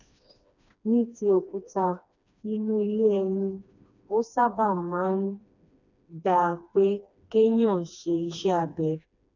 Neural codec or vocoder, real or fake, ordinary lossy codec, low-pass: codec, 16 kHz, 2 kbps, FreqCodec, smaller model; fake; none; 7.2 kHz